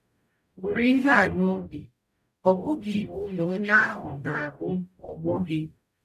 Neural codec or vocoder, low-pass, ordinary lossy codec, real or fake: codec, 44.1 kHz, 0.9 kbps, DAC; 14.4 kHz; none; fake